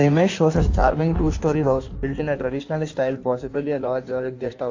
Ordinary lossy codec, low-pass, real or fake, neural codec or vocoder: AAC, 48 kbps; 7.2 kHz; fake; codec, 16 kHz in and 24 kHz out, 1.1 kbps, FireRedTTS-2 codec